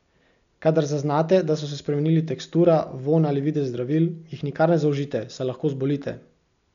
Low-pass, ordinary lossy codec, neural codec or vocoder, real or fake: 7.2 kHz; MP3, 96 kbps; none; real